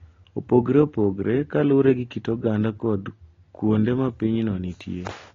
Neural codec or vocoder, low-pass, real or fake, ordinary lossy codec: none; 7.2 kHz; real; AAC, 32 kbps